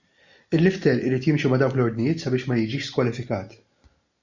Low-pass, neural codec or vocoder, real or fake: 7.2 kHz; none; real